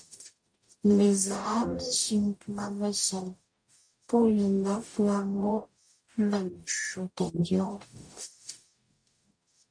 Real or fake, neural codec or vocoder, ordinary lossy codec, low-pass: fake; codec, 44.1 kHz, 0.9 kbps, DAC; MP3, 48 kbps; 9.9 kHz